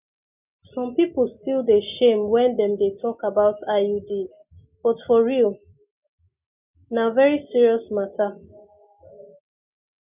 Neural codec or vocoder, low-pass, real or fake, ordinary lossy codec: none; 3.6 kHz; real; none